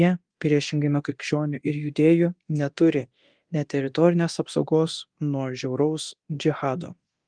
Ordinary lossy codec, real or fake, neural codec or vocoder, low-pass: Opus, 24 kbps; fake; codec, 24 kHz, 0.9 kbps, DualCodec; 9.9 kHz